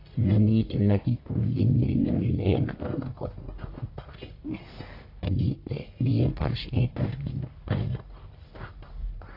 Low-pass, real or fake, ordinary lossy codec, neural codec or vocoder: 5.4 kHz; fake; AAC, 32 kbps; codec, 44.1 kHz, 1.7 kbps, Pupu-Codec